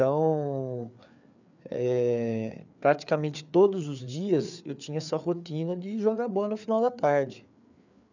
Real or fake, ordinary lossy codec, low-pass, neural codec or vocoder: fake; none; 7.2 kHz; codec, 16 kHz, 4 kbps, FreqCodec, larger model